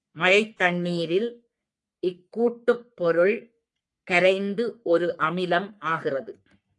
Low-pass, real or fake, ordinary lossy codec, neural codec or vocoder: 10.8 kHz; fake; AAC, 64 kbps; codec, 44.1 kHz, 3.4 kbps, Pupu-Codec